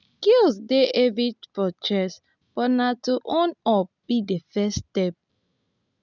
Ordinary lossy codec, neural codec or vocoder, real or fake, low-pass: none; none; real; 7.2 kHz